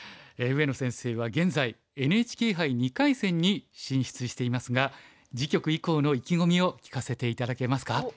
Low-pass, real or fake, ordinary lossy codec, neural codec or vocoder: none; real; none; none